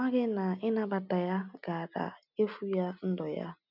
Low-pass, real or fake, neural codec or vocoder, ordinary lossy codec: 5.4 kHz; real; none; none